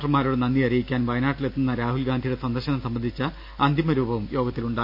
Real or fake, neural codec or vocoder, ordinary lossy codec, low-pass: real; none; none; 5.4 kHz